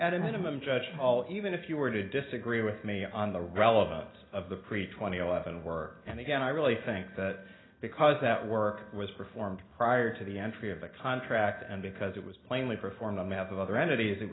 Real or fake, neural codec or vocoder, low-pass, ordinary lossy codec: real; none; 7.2 kHz; AAC, 16 kbps